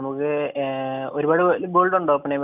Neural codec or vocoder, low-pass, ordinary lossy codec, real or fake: none; 3.6 kHz; none; real